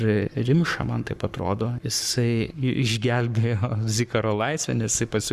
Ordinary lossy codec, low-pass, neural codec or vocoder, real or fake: Opus, 64 kbps; 14.4 kHz; codec, 44.1 kHz, 7.8 kbps, Pupu-Codec; fake